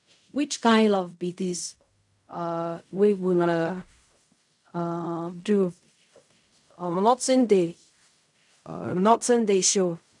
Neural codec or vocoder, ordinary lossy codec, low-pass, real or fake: codec, 16 kHz in and 24 kHz out, 0.4 kbps, LongCat-Audio-Codec, fine tuned four codebook decoder; none; 10.8 kHz; fake